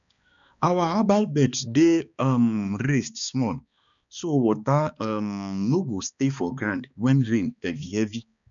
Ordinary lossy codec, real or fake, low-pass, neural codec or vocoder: none; fake; 7.2 kHz; codec, 16 kHz, 2 kbps, X-Codec, HuBERT features, trained on balanced general audio